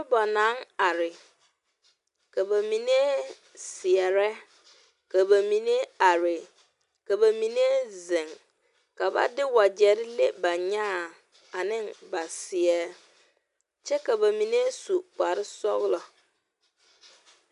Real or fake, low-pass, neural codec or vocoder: real; 10.8 kHz; none